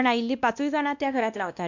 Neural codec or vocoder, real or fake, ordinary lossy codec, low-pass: codec, 16 kHz, 1 kbps, X-Codec, WavLM features, trained on Multilingual LibriSpeech; fake; none; 7.2 kHz